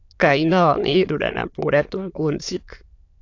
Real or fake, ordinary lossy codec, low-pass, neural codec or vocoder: fake; AAC, 48 kbps; 7.2 kHz; autoencoder, 22.05 kHz, a latent of 192 numbers a frame, VITS, trained on many speakers